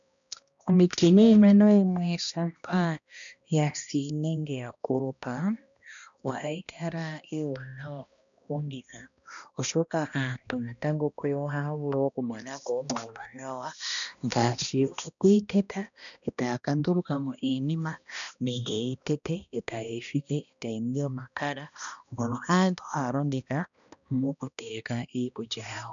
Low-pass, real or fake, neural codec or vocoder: 7.2 kHz; fake; codec, 16 kHz, 1 kbps, X-Codec, HuBERT features, trained on balanced general audio